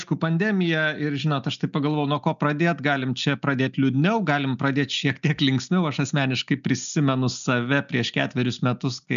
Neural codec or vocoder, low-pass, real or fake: none; 7.2 kHz; real